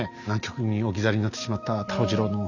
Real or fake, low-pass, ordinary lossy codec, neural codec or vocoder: real; 7.2 kHz; none; none